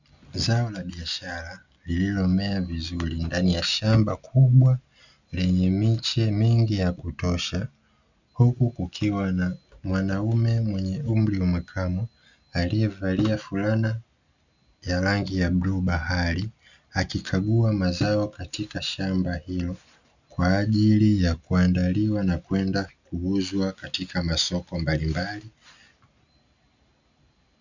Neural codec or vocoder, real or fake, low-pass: none; real; 7.2 kHz